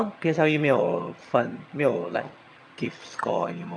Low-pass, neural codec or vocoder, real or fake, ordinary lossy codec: none; vocoder, 22.05 kHz, 80 mel bands, HiFi-GAN; fake; none